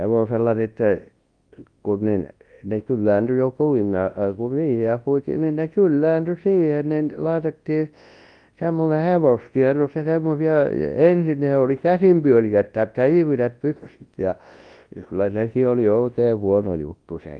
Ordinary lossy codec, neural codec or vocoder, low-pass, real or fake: Opus, 24 kbps; codec, 24 kHz, 0.9 kbps, WavTokenizer, large speech release; 9.9 kHz; fake